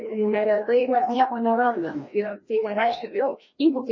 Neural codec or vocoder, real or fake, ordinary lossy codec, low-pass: codec, 16 kHz, 1 kbps, FreqCodec, larger model; fake; MP3, 32 kbps; 7.2 kHz